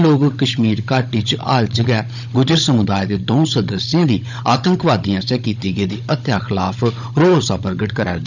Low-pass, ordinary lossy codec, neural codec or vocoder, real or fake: 7.2 kHz; none; codec, 16 kHz, 16 kbps, FunCodec, trained on Chinese and English, 50 frames a second; fake